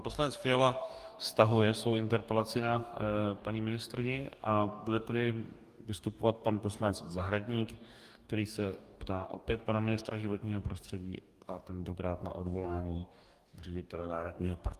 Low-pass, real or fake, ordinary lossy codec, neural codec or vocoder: 14.4 kHz; fake; Opus, 32 kbps; codec, 44.1 kHz, 2.6 kbps, DAC